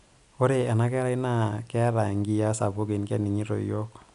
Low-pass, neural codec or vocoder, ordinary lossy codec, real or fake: 10.8 kHz; none; none; real